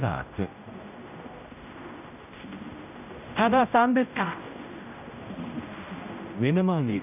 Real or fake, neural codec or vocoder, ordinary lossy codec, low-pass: fake; codec, 16 kHz, 0.5 kbps, X-Codec, HuBERT features, trained on general audio; none; 3.6 kHz